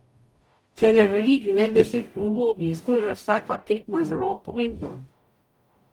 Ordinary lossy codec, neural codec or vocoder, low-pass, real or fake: Opus, 32 kbps; codec, 44.1 kHz, 0.9 kbps, DAC; 19.8 kHz; fake